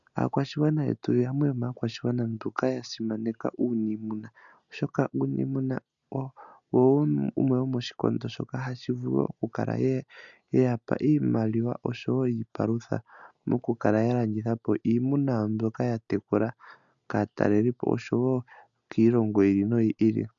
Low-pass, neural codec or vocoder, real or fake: 7.2 kHz; none; real